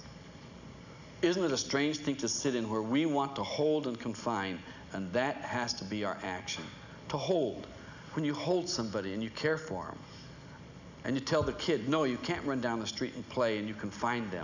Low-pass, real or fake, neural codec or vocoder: 7.2 kHz; real; none